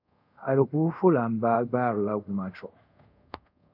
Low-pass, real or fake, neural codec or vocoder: 5.4 kHz; fake; codec, 24 kHz, 0.5 kbps, DualCodec